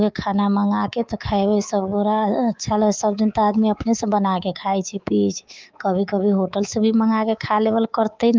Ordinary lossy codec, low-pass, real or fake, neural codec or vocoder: Opus, 24 kbps; 7.2 kHz; fake; codec, 24 kHz, 3.1 kbps, DualCodec